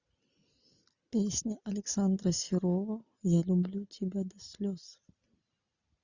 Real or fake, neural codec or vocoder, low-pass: real; none; 7.2 kHz